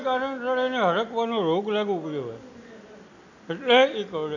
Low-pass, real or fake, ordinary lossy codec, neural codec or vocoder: 7.2 kHz; real; none; none